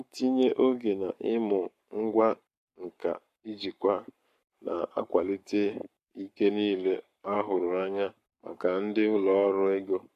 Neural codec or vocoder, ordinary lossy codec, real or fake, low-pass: codec, 44.1 kHz, 7.8 kbps, Pupu-Codec; MP3, 64 kbps; fake; 14.4 kHz